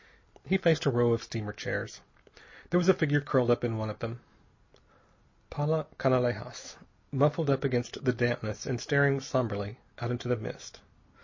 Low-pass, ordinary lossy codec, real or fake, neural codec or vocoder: 7.2 kHz; MP3, 32 kbps; real; none